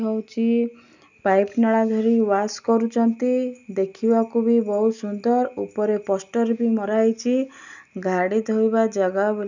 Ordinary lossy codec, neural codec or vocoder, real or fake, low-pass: none; none; real; 7.2 kHz